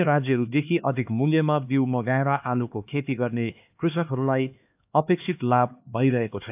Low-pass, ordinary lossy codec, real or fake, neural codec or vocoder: 3.6 kHz; AAC, 32 kbps; fake; codec, 16 kHz, 2 kbps, X-Codec, HuBERT features, trained on LibriSpeech